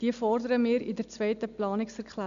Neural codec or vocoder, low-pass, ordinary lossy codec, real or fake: none; 7.2 kHz; none; real